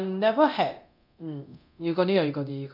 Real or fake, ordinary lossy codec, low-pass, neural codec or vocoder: fake; none; 5.4 kHz; codec, 24 kHz, 0.9 kbps, DualCodec